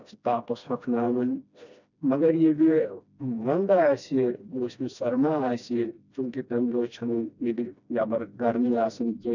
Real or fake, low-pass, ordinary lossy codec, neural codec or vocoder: fake; 7.2 kHz; none; codec, 16 kHz, 1 kbps, FreqCodec, smaller model